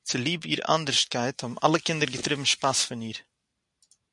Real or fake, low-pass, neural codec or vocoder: real; 10.8 kHz; none